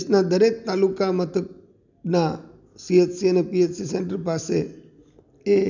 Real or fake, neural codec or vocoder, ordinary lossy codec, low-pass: real; none; none; 7.2 kHz